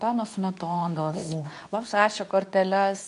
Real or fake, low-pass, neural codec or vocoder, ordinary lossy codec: fake; 10.8 kHz; codec, 24 kHz, 0.9 kbps, WavTokenizer, medium speech release version 2; AAC, 96 kbps